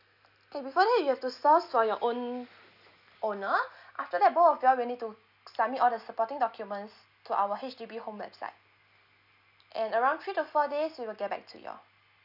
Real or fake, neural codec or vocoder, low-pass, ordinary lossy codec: real; none; 5.4 kHz; none